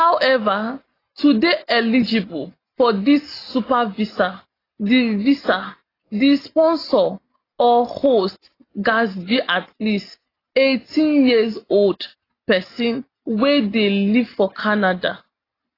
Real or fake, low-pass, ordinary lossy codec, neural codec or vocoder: real; 5.4 kHz; AAC, 24 kbps; none